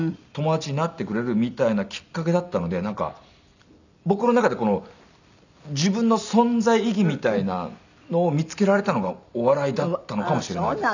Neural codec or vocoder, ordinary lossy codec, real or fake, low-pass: none; none; real; 7.2 kHz